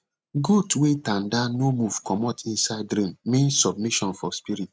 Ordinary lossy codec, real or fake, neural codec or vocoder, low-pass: none; real; none; none